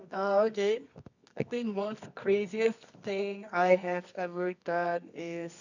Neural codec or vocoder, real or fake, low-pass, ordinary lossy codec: codec, 24 kHz, 0.9 kbps, WavTokenizer, medium music audio release; fake; 7.2 kHz; none